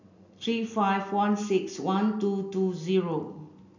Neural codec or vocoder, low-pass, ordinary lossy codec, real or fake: none; 7.2 kHz; none; real